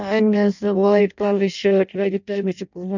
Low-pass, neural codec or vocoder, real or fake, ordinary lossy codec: 7.2 kHz; codec, 16 kHz in and 24 kHz out, 0.6 kbps, FireRedTTS-2 codec; fake; none